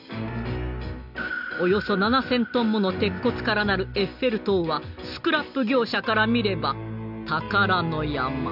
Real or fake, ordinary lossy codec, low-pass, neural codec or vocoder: real; none; 5.4 kHz; none